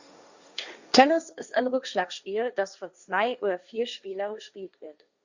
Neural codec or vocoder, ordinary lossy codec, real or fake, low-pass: codec, 16 kHz, 1.1 kbps, Voila-Tokenizer; Opus, 64 kbps; fake; 7.2 kHz